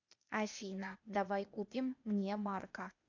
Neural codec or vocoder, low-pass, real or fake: codec, 16 kHz, 0.8 kbps, ZipCodec; 7.2 kHz; fake